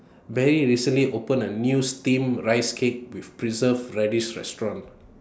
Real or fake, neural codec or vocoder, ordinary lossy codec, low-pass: real; none; none; none